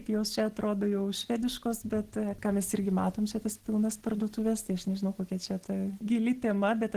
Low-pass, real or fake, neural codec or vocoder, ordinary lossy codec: 14.4 kHz; real; none; Opus, 16 kbps